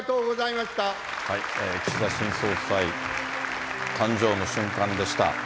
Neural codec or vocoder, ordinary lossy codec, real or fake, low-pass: none; none; real; none